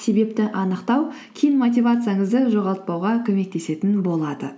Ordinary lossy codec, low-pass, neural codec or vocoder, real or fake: none; none; none; real